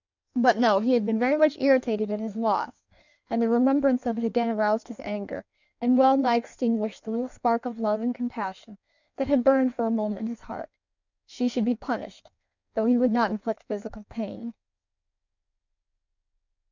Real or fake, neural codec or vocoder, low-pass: fake; codec, 16 kHz in and 24 kHz out, 1.1 kbps, FireRedTTS-2 codec; 7.2 kHz